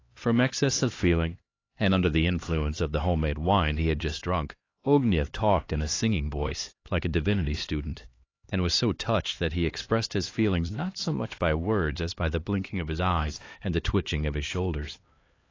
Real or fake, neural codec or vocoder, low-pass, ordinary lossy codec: fake; codec, 16 kHz, 2 kbps, X-Codec, HuBERT features, trained on LibriSpeech; 7.2 kHz; AAC, 32 kbps